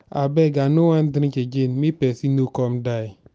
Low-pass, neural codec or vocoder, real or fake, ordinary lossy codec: none; none; real; none